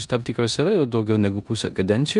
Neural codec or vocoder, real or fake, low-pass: codec, 16 kHz in and 24 kHz out, 0.9 kbps, LongCat-Audio-Codec, four codebook decoder; fake; 10.8 kHz